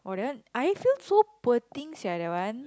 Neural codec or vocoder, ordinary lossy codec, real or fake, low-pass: none; none; real; none